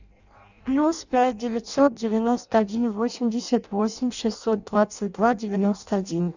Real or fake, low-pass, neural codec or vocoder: fake; 7.2 kHz; codec, 16 kHz in and 24 kHz out, 0.6 kbps, FireRedTTS-2 codec